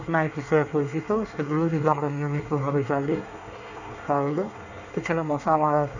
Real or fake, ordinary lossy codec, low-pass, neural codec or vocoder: fake; none; 7.2 kHz; codec, 24 kHz, 1 kbps, SNAC